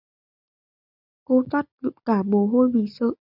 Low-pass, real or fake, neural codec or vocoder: 5.4 kHz; real; none